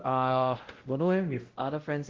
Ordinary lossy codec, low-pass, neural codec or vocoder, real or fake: Opus, 16 kbps; 7.2 kHz; codec, 16 kHz, 0.5 kbps, X-Codec, WavLM features, trained on Multilingual LibriSpeech; fake